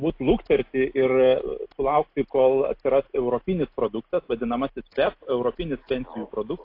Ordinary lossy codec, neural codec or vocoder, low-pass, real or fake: AAC, 32 kbps; none; 5.4 kHz; real